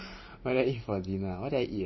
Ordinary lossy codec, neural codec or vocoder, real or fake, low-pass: MP3, 24 kbps; vocoder, 44.1 kHz, 128 mel bands every 512 samples, BigVGAN v2; fake; 7.2 kHz